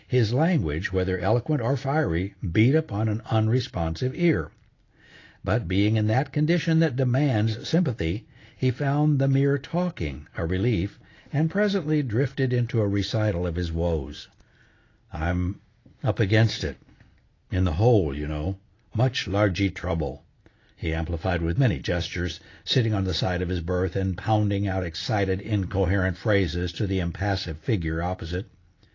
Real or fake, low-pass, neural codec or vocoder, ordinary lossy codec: real; 7.2 kHz; none; AAC, 32 kbps